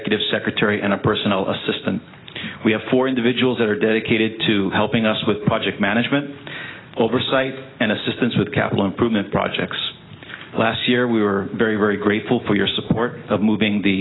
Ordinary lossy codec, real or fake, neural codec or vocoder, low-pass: AAC, 16 kbps; real; none; 7.2 kHz